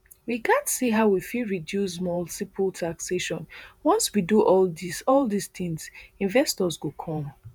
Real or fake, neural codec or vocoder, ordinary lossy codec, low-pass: fake; vocoder, 48 kHz, 128 mel bands, Vocos; none; none